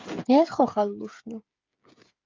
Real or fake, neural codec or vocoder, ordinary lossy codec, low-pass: fake; codec, 16 kHz, 8 kbps, FreqCodec, smaller model; Opus, 24 kbps; 7.2 kHz